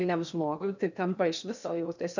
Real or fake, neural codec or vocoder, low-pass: fake; codec, 16 kHz in and 24 kHz out, 0.8 kbps, FocalCodec, streaming, 65536 codes; 7.2 kHz